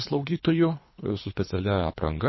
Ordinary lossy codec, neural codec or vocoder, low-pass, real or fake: MP3, 24 kbps; codec, 24 kHz, 3 kbps, HILCodec; 7.2 kHz; fake